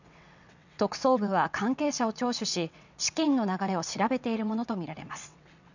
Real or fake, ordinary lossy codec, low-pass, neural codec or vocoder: fake; none; 7.2 kHz; vocoder, 22.05 kHz, 80 mel bands, WaveNeXt